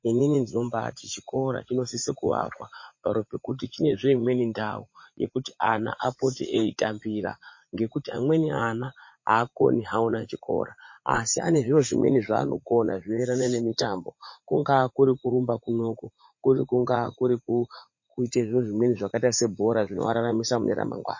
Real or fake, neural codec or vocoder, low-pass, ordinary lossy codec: fake; vocoder, 44.1 kHz, 80 mel bands, Vocos; 7.2 kHz; MP3, 32 kbps